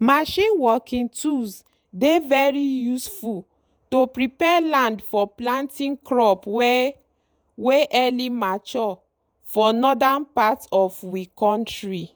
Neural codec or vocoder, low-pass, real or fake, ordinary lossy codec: vocoder, 48 kHz, 128 mel bands, Vocos; none; fake; none